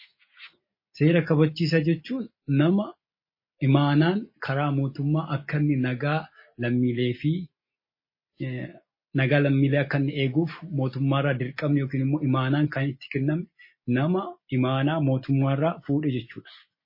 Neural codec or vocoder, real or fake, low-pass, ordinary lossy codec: none; real; 5.4 kHz; MP3, 24 kbps